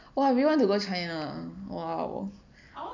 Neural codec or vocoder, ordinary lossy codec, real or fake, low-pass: none; MP3, 64 kbps; real; 7.2 kHz